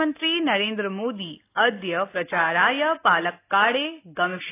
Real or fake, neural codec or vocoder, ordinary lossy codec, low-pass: real; none; AAC, 24 kbps; 3.6 kHz